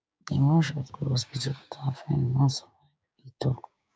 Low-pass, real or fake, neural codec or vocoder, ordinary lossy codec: none; fake; codec, 16 kHz, 6 kbps, DAC; none